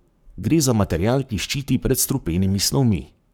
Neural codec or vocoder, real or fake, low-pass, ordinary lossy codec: codec, 44.1 kHz, 7.8 kbps, Pupu-Codec; fake; none; none